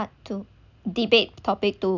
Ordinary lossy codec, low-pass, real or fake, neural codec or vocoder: none; 7.2 kHz; real; none